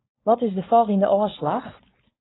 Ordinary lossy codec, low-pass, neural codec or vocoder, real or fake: AAC, 16 kbps; 7.2 kHz; codec, 16 kHz, 4.8 kbps, FACodec; fake